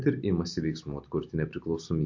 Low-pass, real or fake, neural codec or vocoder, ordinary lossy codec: 7.2 kHz; real; none; MP3, 48 kbps